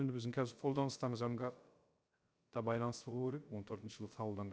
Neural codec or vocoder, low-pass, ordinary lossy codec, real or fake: codec, 16 kHz, 0.3 kbps, FocalCodec; none; none; fake